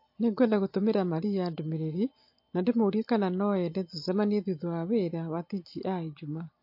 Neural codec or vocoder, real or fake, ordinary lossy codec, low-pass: none; real; MP3, 32 kbps; 5.4 kHz